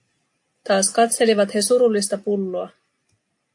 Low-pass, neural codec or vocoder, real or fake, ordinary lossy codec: 10.8 kHz; none; real; AAC, 64 kbps